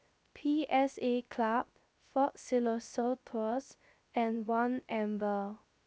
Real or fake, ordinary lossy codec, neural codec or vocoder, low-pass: fake; none; codec, 16 kHz, 0.3 kbps, FocalCodec; none